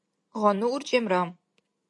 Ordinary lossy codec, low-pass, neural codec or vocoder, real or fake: MP3, 48 kbps; 10.8 kHz; none; real